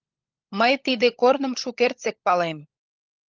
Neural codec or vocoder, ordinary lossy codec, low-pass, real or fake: codec, 16 kHz, 16 kbps, FunCodec, trained on LibriTTS, 50 frames a second; Opus, 24 kbps; 7.2 kHz; fake